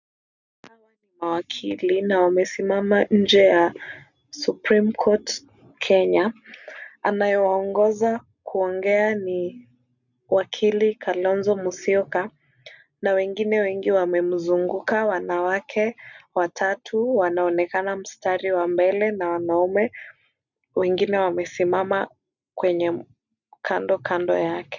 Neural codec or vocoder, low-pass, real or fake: none; 7.2 kHz; real